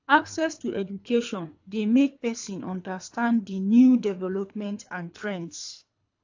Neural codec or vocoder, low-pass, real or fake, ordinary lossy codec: codec, 24 kHz, 3 kbps, HILCodec; 7.2 kHz; fake; AAC, 48 kbps